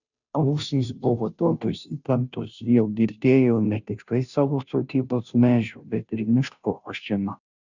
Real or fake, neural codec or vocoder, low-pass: fake; codec, 16 kHz, 0.5 kbps, FunCodec, trained on Chinese and English, 25 frames a second; 7.2 kHz